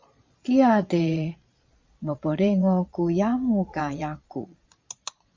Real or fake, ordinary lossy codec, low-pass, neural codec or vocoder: fake; MP3, 64 kbps; 7.2 kHz; vocoder, 22.05 kHz, 80 mel bands, Vocos